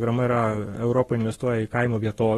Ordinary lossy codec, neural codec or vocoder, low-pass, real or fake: AAC, 32 kbps; codec, 44.1 kHz, 7.8 kbps, Pupu-Codec; 19.8 kHz; fake